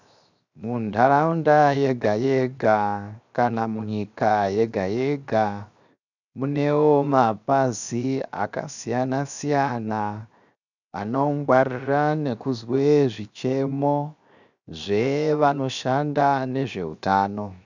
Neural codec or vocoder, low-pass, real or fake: codec, 16 kHz, 0.7 kbps, FocalCodec; 7.2 kHz; fake